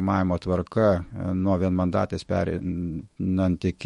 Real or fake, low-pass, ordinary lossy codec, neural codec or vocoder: fake; 19.8 kHz; MP3, 48 kbps; autoencoder, 48 kHz, 128 numbers a frame, DAC-VAE, trained on Japanese speech